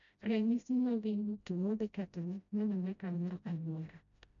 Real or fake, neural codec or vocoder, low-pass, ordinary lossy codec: fake; codec, 16 kHz, 0.5 kbps, FreqCodec, smaller model; 7.2 kHz; none